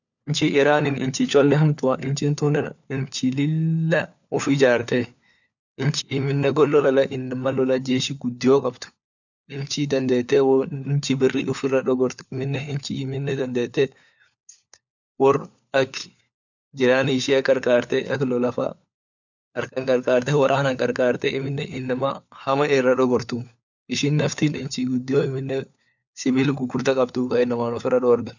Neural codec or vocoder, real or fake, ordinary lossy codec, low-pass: codec, 16 kHz, 4 kbps, FunCodec, trained on LibriTTS, 50 frames a second; fake; none; 7.2 kHz